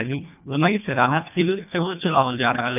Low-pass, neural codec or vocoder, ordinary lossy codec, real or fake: 3.6 kHz; codec, 24 kHz, 1.5 kbps, HILCodec; none; fake